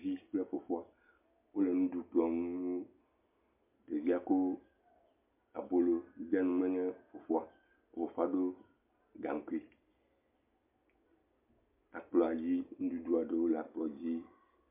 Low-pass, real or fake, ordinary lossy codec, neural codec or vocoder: 3.6 kHz; real; MP3, 32 kbps; none